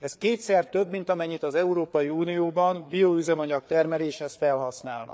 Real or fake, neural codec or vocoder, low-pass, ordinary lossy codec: fake; codec, 16 kHz, 4 kbps, FreqCodec, larger model; none; none